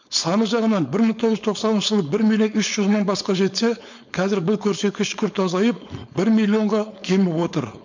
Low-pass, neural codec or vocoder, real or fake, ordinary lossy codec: 7.2 kHz; codec, 16 kHz, 4.8 kbps, FACodec; fake; MP3, 64 kbps